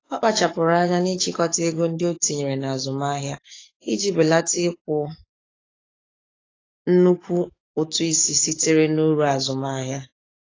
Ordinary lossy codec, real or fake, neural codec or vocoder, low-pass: AAC, 32 kbps; fake; codec, 44.1 kHz, 7.8 kbps, DAC; 7.2 kHz